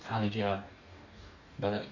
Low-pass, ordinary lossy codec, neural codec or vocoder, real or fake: 7.2 kHz; none; codec, 44.1 kHz, 2.6 kbps, DAC; fake